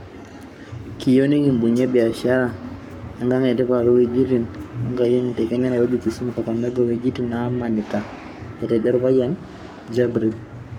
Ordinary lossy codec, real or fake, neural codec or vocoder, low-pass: MP3, 96 kbps; fake; codec, 44.1 kHz, 7.8 kbps, Pupu-Codec; 19.8 kHz